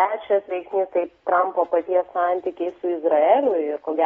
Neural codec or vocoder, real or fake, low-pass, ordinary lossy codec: none; real; 14.4 kHz; AAC, 24 kbps